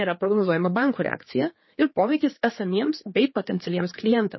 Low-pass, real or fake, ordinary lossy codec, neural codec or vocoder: 7.2 kHz; fake; MP3, 24 kbps; codec, 16 kHz, 4 kbps, X-Codec, HuBERT features, trained on general audio